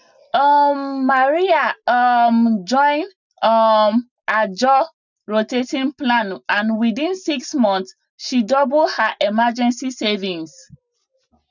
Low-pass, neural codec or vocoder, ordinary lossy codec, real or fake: 7.2 kHz; none; none; real